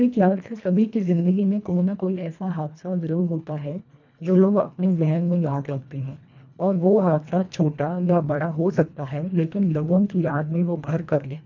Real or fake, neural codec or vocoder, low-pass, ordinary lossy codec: fake; codec, 24 kHz, 1.5 kbps, HILCodec; 7.2 kHz; none